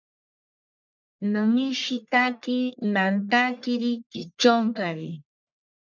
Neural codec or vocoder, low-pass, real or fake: codec, 44.1 kHz, 1.7 kbps, Pupu-Codec; 7.2 kHz; fake